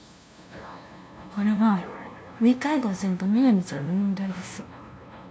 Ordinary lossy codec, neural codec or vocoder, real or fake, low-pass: none; codec, 16 kHz, 0.5 kbps, FunCodec, trained on LibriTTS, 25 frames a second; fake; none